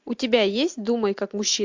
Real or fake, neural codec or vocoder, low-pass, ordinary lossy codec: real; none; 7.2 kHz; MP3, 64 kbps